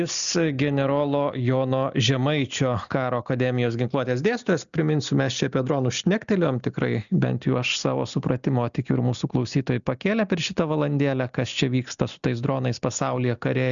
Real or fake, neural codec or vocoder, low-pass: real; none; 7.2 kHz